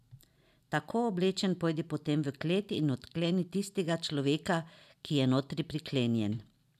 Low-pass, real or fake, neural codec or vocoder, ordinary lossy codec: 14.4 kHz; real; none; none